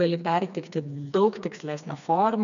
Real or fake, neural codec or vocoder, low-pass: fake; codec, 16 kHz, 2 kbps, FreqCodec, smaller model; 7.2 kHz